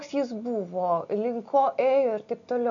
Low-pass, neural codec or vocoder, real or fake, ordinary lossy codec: 7.2 kHz; none; real; MP3, 96 kbps